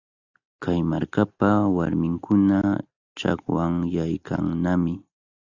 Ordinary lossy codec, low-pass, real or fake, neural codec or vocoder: Opus, 64 kbps; 7.2 kHz; real; none